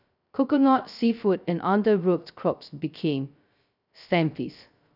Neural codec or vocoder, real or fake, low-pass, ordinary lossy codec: codec, 16 kHz, 0.2 kbps, FocalCodec; fake; 5.4 kHz; none